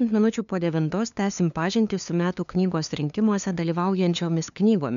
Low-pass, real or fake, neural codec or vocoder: 7.2 kHz; fake; codec, 16 kHz, 2 kbps, FunCodec, trained on LibriTTS, 25 frames a second